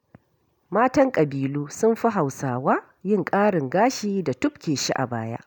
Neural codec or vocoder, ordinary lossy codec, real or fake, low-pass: none; none; real; none